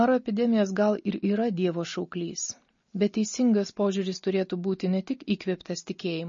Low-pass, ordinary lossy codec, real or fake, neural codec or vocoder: 7.2 kHz; MP3, 32 kbps; real; none